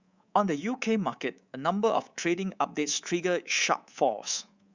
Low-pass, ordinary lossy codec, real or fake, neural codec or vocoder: 7.2 kHz; Opus, 64 kbps; fake; codec, 24 kHz, 3.1 kbps, DualCodec